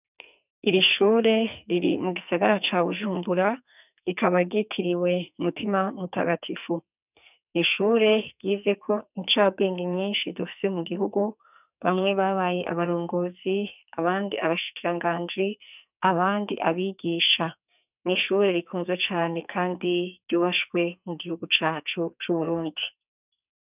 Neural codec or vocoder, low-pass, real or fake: codec, 44.1 kHz, 2.6 kbps, SNAC; 3.6 kHz; fake